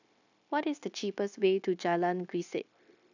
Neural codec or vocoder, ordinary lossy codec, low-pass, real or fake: codec, 16 kHz, 0.9 kbps, LongCat-Audio-Codec; none; 7.2 kHz; fake